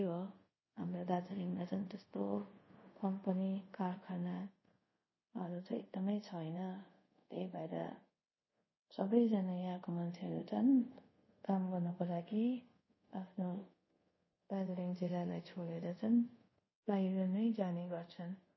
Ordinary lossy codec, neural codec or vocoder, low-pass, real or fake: MP3, 24 kbps; codec, 24 kHz, 0.5 kbps, DualCodec; 7.2 kHz; fake